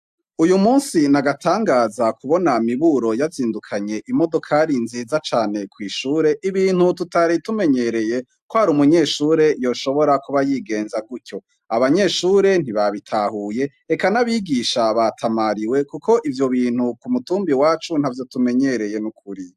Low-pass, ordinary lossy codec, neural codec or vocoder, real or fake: 14.4 kHz; AAC, 96 kbps; none; real